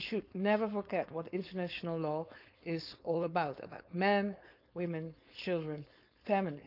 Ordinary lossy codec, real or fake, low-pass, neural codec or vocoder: none; fake; 5.4 kHz; codec, 16 kHz, 4.8 kbps, FACodec